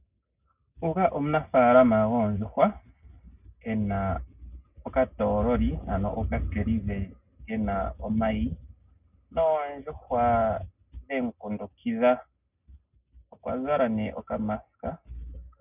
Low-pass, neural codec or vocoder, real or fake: 3.6 kHz; none; real